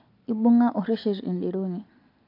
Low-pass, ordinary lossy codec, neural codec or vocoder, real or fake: 5.4 kHz; AAC, 32 kbps; none; real